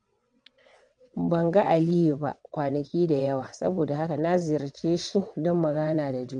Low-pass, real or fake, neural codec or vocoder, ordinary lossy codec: 9.9 kHz; fake; vocoder, 22.05 kHz, 80 mel bands, WaveNeXt; MP3, 64 kbps